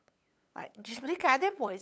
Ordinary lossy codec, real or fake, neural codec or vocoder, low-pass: none; fake; codec, 16 kHz, 8 kbps, FunCodec, trained on LibriTTS, 25 frames a second; none